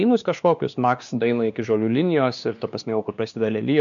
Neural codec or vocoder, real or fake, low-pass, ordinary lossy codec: codec, 16 kHz, 2 kbps, X-Codec, WavLM features, trained on Multilingual LibriSpeech; fake; 7.2 kHz; MP3, 96 kbps